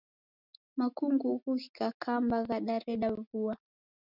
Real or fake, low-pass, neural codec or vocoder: real; 5.4 kHz; none